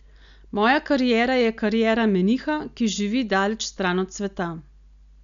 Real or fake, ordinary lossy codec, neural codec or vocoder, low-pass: real; none; none; 7.2 kHz